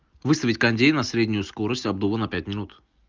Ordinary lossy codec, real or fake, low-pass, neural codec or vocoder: Opus, 32 kbps; real; 7.2 kHz; none